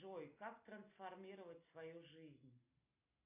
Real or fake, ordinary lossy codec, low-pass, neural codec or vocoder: real; AAC, 24 kbps; 3.6 kHz; none